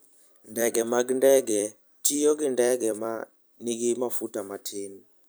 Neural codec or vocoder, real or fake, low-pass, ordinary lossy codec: vocoder, 44.1 kHz, 128 mel bands every 256 samples, BigVGAN v2; fake; none; none